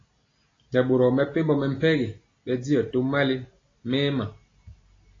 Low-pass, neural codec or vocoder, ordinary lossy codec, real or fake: 7.2 kHz; none; AAC, 32 kbps; real